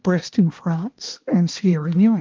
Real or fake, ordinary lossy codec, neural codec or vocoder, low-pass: fake; Opus, 32 kbps; codec, 16 kHz, 1 kbps, X-Codec, HuBERT features, trained on balanced general audio; 7.2 kHz